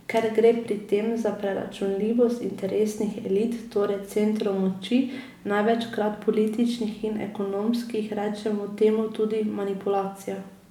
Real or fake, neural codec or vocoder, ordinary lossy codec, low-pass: real; none; none; 19.8 kHz